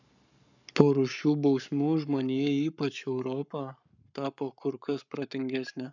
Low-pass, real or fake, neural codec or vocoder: 7.2 kHz; fake; codec, 44.1 kHz, 7.8 kbps, Pupu-Codec